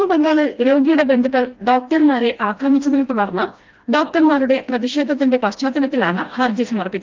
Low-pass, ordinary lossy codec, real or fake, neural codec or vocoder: 7.2 kHz; Opus, 24 kbps; fake; codec, 16 kHz, 1 kbps, FreqCodec, smaller model